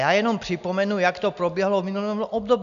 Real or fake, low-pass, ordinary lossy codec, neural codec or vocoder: real; 7.2 kHz; AAC, 96 kbps; none